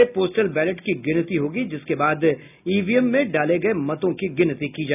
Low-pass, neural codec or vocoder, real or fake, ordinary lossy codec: 3.6 kHz; none; real; none